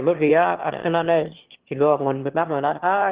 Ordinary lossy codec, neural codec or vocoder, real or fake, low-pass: Opus, 16 kbps; autoencoder, 22.05 kHz, a latent of 192 numbers a frame, VITS, trained on one speaker; fake; 3.6 kHz